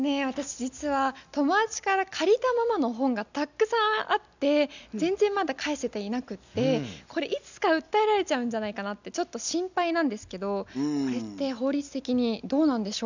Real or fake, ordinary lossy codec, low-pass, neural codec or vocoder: real; none; 7.2 kHz; none